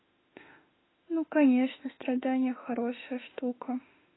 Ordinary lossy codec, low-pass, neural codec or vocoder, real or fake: AAC, 16 kbps; 7.2 kHz; autoencoder, 48 kHz, 32 numbers a frame, DAC-VAE, trained on Japanese speech; fake